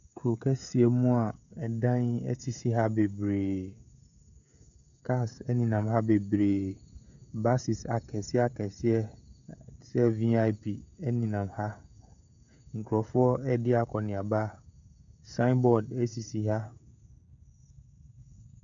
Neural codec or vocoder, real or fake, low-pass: codec, 16 kHz, 16 kbps, FreqCodec, smaller model; fake; 7.2 kHz